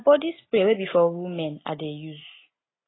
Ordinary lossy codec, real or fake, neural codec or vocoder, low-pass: AAC, 16 kbps; real; none; 7.2 kHz